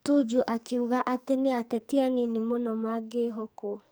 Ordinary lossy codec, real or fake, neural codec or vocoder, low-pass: none; fake; codec, 44.1 kHz, 2.6 kbps, SNAC; none